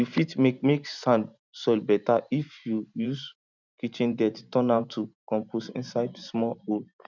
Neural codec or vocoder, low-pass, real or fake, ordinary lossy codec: vocoder, 44.1 kHz, 128 mel bands every 512 samples, BigVGAN v2; 7.2 kHz; fake; none